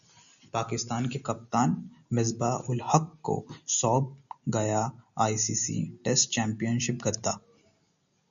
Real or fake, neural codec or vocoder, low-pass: real; none; 7.2 kHz